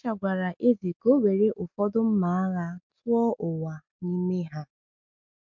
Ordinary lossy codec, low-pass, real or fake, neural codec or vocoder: MP3, 48 kbps; 7.2 kHz; real; none